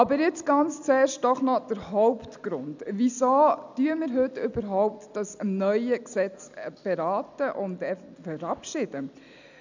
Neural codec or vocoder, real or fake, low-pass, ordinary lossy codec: none; real; 7.2 kHz; none